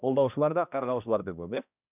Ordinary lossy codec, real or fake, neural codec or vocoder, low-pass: none; fake; codec, 16 kHz, 1 kbps, X-Codec, HuBERT features, trained on balanced general audio; 3.6 kHz